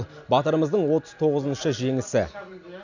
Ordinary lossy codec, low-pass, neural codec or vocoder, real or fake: none; 7.2 kHz; none; real